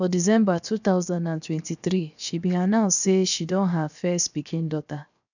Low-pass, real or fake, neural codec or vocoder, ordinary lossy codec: 7.2 kHz; fake; codec, 16 kHz, 0.7 kbps, FocalCodec; none